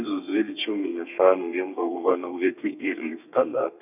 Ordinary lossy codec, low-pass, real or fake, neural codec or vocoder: none; 3.6 kHz; fake; codec, 32 kHz, 1.9 kbps, SNAC